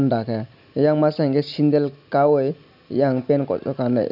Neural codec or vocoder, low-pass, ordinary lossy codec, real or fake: none; 5.4 kHz; none; real